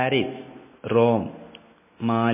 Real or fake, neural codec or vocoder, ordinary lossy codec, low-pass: real; none; MP3, 16 kbps; 3.6 kHz